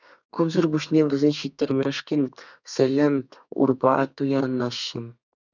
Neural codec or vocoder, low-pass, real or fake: codec, 32 kHz, 1.9 kbps, SNAC; 7.2 kHz; fake